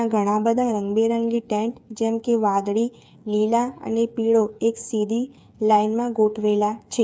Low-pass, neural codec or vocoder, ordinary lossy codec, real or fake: none; codec, 16 kHz, 8 kbps, FreqCodec, smaller model; none; fake